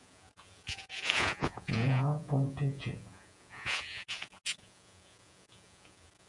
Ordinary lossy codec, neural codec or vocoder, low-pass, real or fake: MP3, 64 kbps; vocoder, 48 kHz, 128 mel bands, Vocos; 10.8 kHz; fake